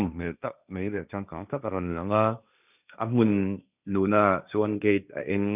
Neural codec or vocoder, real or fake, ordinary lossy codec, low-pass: codec, 16 kHz, 1.1 kbps, Voila-Tokenizer; fake; none; 3.6 kHz